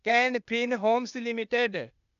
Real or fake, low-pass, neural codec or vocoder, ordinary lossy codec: fake; 7.2 kHz; codec, 16 kHz, 0.8 kbps, ZipCodec; none